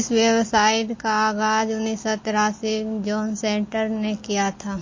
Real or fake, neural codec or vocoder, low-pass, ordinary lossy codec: real; none; 7.2 kHz; MP3, 32 kbps